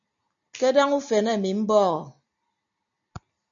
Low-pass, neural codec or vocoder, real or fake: 7.2 kHz; none; real